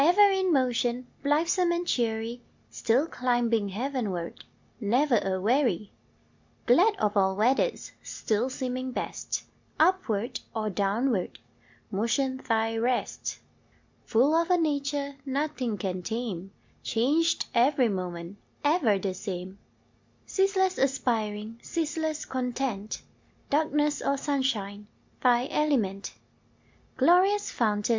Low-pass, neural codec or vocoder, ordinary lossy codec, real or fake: 7.2 kHz; none; MP3, 64 kbps; real